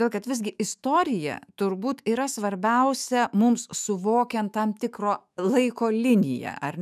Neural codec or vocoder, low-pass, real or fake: autoencoder, 48 kHz, 128 numbers a frame, DAC-VAE, trained on Japanese speech; 14.4 kHz; fake